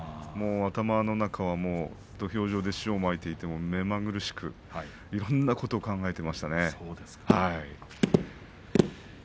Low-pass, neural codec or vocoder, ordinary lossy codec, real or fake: none; none; none; real